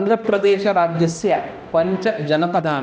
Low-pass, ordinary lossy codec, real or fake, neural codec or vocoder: none; none; fake; codec, 16 kHz, 1 kbps, X-Codec, HuBERT features, trained on balanced general audio